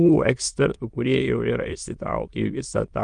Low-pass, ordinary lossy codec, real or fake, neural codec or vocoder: 9.9 kHz; Opus, 32 kbps; fake; autoencoder, 22.05 kHz, a latent of 192 numbers a frame, VITS, trained on many speakers